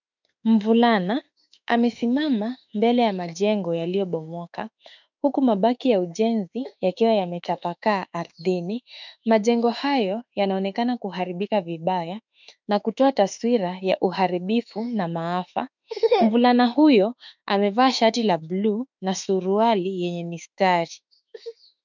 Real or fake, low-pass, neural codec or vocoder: fake; 7.2 kHz; autoencoder, 48 kHz, 32 numbers a frame, DAC-VAE, trained on Japanese speech